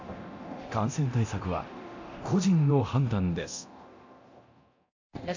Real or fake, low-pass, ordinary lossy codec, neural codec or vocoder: fake; 7.2 kHz; AAC, 48 kbps; codec, 24 kHz, 0.9 kbps, DualCodec